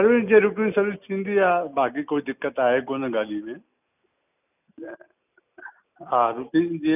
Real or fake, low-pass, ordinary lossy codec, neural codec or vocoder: real; 3.6 kHz; none; none